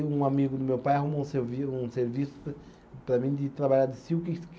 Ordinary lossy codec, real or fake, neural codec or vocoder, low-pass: none; real; none; none